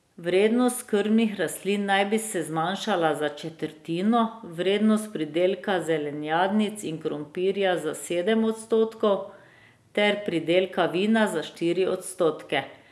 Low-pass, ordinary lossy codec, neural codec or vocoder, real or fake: none; none; none; real